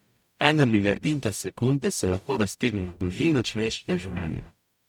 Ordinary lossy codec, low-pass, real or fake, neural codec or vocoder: none; 19.8 kHz; fake; codec, 44.1 kHz, 0.9 kbps, DAC